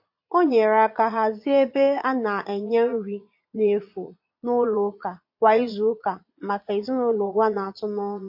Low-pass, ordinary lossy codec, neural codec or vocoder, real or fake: 5.4 kHz; MP3, 32 kbps; vocoder, 22.05 kHz, 80 mel bands, Vocos; fake